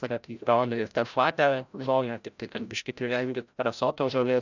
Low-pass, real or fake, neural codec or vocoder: 7.2 kHz; fake; codec, 16 kHz, 0.5 kbps, FreqCodec, larger model